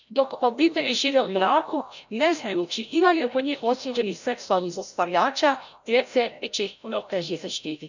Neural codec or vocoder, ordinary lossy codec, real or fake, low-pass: codec, 16 kHz, 0.5 kbps, FreqCodec, larger model; none; fake; 7.2 kHz